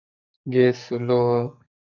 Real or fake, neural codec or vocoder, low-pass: fake; codec, 44.1 kHz, 2.6 kbps, SNAC; 7.2 kHz